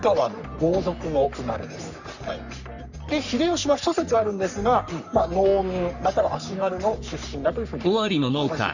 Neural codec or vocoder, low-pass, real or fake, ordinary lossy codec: codec, 44.1 kHz, 3.4 kbps, Pupu-Codec; 7.2 kHz; fake; none